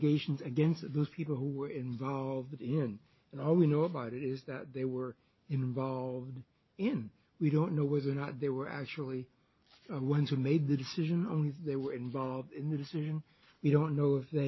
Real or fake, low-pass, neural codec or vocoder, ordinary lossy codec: real; 7.2 kHz; none; MP3, 24 kbps